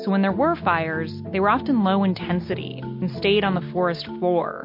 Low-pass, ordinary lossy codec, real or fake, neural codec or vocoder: 5.4 kHz; MP3, 32 kbps; real; none